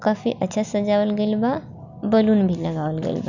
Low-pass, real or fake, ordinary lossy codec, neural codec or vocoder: 7.2 kHz; real; none; none